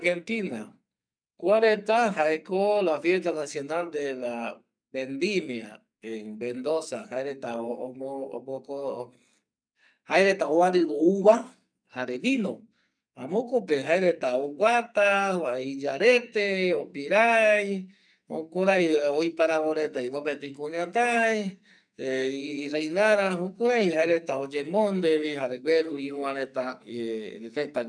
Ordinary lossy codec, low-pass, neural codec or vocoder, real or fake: none; 9.9 kHz; codec, 44.1 kHz, 2.6 kbps, SNAC; fake